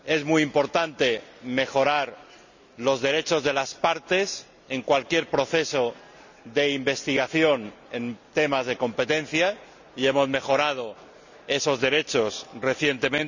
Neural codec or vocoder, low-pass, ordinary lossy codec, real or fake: none; 7.2 kHz; none; real